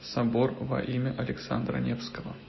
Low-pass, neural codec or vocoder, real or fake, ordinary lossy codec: 7.2 kHz; none; real; MP3, 24 kbps